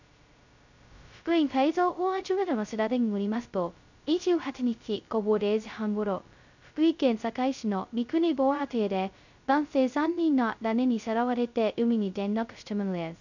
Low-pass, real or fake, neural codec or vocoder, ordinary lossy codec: 7.2 kHz; fake; codec, 16 kHz, 0.2 kbps, FocalCodec; none